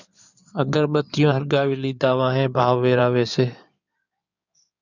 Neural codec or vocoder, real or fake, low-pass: codec, 16 kHz in and 24 kHz out, 2.2 kbps, FireRedTTS-2 codec; fake; 7.2 kHz